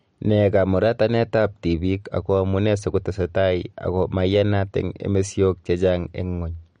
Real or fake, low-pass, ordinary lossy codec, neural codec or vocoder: real; 9.9 kHz; MP3, 48 kbps; none